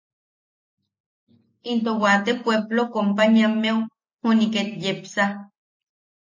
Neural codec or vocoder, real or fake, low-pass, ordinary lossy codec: none; real; 7.2 kHz; MP3, 32 kbps